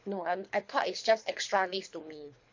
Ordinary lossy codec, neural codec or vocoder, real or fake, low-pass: MP3, 48 kbps; codec, 24 kHz, 3 kbps, HILCodec; fake; 7.2 kHz